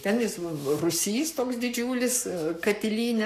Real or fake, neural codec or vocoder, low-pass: fake; codec, 44.1 kHz, 7.8 kbps, Pupu-Codec; 14.4 kHz